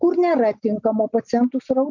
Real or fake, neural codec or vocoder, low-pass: real; none; 7.2 kHz